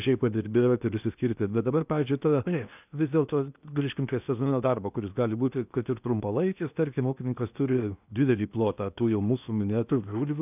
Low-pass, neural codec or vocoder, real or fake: 3.6 kHz; codec, 16 kHz in and 24 kHz out, 0.8 kbps, FocalCodec, streaming, 65536 codes; fake